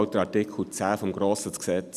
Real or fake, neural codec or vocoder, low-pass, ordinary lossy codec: real; none; 14.4 kHz; none